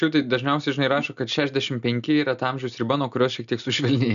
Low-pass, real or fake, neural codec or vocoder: 7.2 kHz; real; none